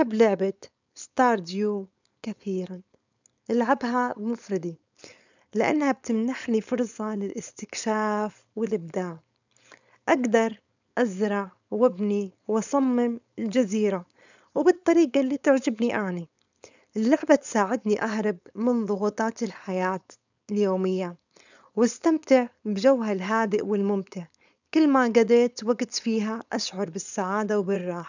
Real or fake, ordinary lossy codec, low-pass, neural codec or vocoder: fake; none; 7.2 kHz; codec, 16 kHz, 4.8 kbps, FACodec